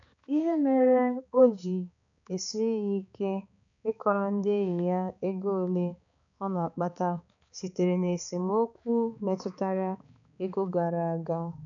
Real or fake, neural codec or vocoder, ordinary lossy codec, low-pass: fake; codec, 16 kHz, 4 kbps, X-Codec, HuBERT features, trained on balanced general audio; none; 7.2 kHz